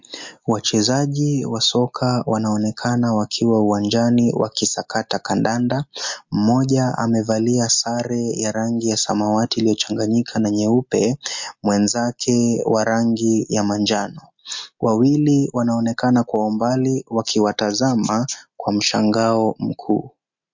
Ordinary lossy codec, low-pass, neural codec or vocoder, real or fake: MP3, 48 kbps; 7.2 kHz; none; real